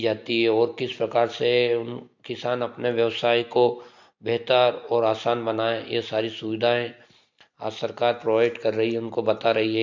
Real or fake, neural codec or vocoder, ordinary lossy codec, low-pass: real; none; MP3, 48 kbps; 7.2 kHz